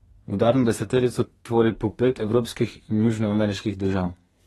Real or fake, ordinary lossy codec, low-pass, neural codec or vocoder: fake; AAC, 32 kbps; 14.4 kHz; codec, 32 kHz, 1.9 kbps, SNAC